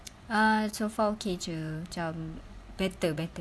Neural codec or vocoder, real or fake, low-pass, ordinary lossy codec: vocoder, 24 kHz, 100 mel bands, Vocos; fake; none; none